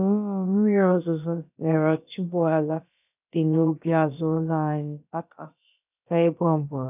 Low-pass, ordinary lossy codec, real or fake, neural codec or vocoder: 3.6 kHz; none; fake; codec, 16 kHz, about 1 kbps, DyCAST, with the encoder's durations